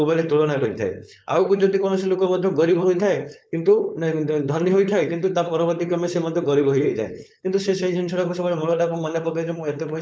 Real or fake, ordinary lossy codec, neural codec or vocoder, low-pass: fake; none; codec, 16 kHz, 4.8 kbps, FACodec; none